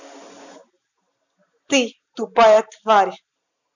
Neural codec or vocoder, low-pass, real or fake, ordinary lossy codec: none; 7.2 kHz; real; none